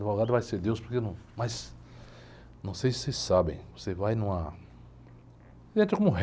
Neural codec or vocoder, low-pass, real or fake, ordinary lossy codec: none; none; real; none